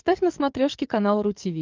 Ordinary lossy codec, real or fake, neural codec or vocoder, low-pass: Opus, 32 kbps; fake; codec, 44.1 kHz, 7.8 kbps, DAC; 7.2 kHz